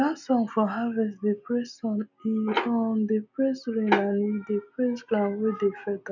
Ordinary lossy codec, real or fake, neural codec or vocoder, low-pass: none; real; none; 7.2 kHz